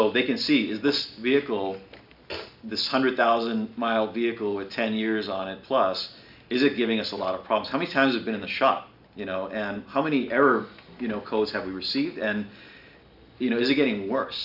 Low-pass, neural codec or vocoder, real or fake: 5.4 kHz; none; real